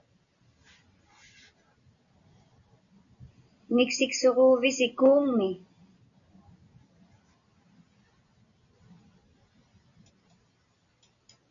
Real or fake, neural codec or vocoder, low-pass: real; none; 7.2 kHz